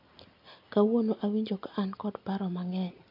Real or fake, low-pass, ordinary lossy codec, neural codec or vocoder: real; 5.4 kHz; AAC, 48 kbps; none